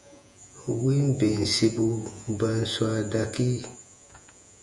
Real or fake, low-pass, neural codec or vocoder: fake; 10.8 kHz; vocoder, 48 kHz, 128 mel bands, Vocos